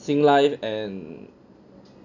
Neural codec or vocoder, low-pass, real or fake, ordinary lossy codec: none; 7.2 kHz; real; none